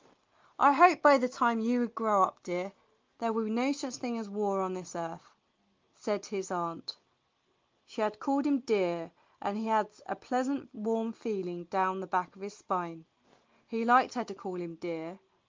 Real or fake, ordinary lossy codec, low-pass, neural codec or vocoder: real; Opus, 32 kbps; 7.2 kHz; none